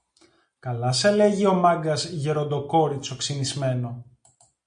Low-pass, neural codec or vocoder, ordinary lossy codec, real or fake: 9.9 kHz; none; MP3, 64 kbps; real